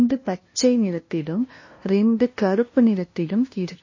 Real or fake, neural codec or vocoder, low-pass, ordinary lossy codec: fake; codec, 16 kHz, 0.5 kbps, FunCodec, trained on LibriTTS, 25 frames a second; 7.2 kHz; MP3, 32 kbps